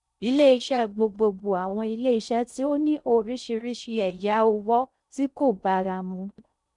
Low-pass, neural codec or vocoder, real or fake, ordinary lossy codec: 10.8 kHz; codec, 16 kHz in and 24 kHz out, 0.6 kbps, FocalCodec, streaming, 4096 codes; fake; none